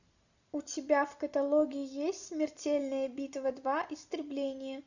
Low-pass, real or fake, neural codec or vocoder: 7.2 kHz; real; none